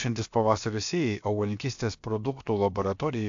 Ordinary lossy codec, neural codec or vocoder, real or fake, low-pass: AAC, 64 kbps; codec, 16 kHz, about 1 kbps, DyCAST, with the encoder's durations; fake; 7.2 kHz